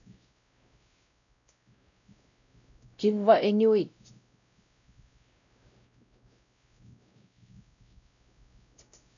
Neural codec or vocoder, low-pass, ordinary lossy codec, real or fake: codec, 16 kHz, 0.5 kbps, X-Codec, WavLM features, trained on Multilingual LibriSpeech; 7.2 kHz; MP3, 96 kbps; fake